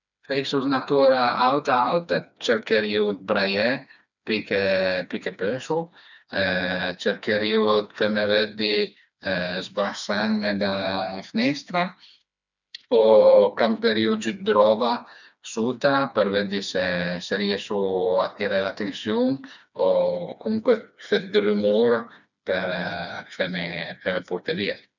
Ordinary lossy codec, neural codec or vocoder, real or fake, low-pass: none; codec, 16 kHz, 2 kbps, FreqCodec, smaller model; fake; 7.2 kHz